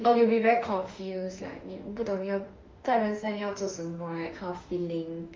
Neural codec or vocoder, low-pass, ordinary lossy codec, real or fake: autoencoder, 48 kHz, 32 numbers a frame, DAC-VAE, trained on Japanese speech; 7.2 kHz; Opus, 32 kbps; fake